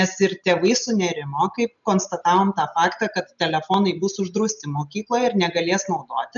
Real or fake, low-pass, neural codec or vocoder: real; 7.2 kHz; none